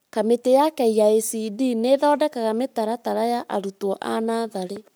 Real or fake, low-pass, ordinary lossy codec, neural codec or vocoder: fake; none; none; codec, 44.1 kHz, 7.8 kbps, Pupu-Codec